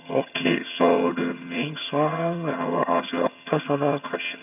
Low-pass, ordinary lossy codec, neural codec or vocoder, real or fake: 3.6 kHz; none; vocoder, 22.05 kHz, 80 mel bands, HiFi-GAN; fake